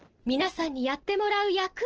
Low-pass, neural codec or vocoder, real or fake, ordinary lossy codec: 7.2 kHz; none; real; Opus, 16 kbps